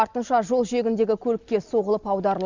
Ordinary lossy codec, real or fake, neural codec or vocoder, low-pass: Opus, 64 kbps; real; none; 7.2 kHz